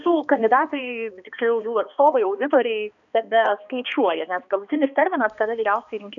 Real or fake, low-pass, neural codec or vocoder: fake; 7.2 kHz; codec, 16 kHz, 2 kbps, X-Codec, HuBERT features, trained on balanced general audio